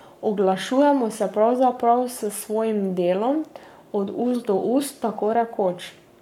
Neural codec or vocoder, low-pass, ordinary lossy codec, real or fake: codec, 44.1 kHz, 7.8 kbps, Pupu-Codec; 19.8 kHz; MP3, 96 kbps; fake